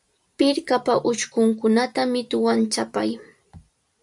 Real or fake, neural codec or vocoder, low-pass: fake; vocoder, 44.1 kHz, 128 mel bands every 512 samples, BigVGAN v2; 10.8 kHz